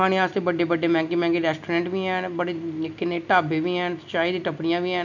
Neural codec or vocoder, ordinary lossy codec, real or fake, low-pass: none; none; real; 7.2 kHz